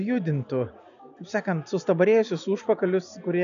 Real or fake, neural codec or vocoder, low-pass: real; none; 7.2 kHz